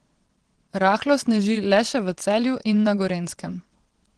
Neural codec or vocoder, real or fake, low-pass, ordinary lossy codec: vocoder, 22.05 kHz, 80 mel bands, WaveNeXt; fake; 9.9 kHz; Opus, 16 kbps